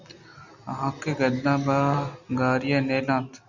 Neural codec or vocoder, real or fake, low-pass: none; real; 7.2 kHz